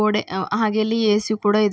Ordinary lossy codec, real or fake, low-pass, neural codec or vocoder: none; real; none; none